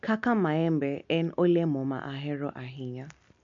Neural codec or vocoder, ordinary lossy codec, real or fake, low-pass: none; MP3, 64 kbps; real; 7.2 kHz